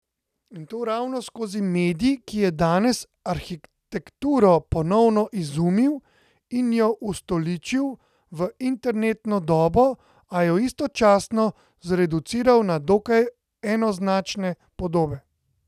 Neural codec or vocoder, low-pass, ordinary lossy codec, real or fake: none; 14.4 kHz; none; real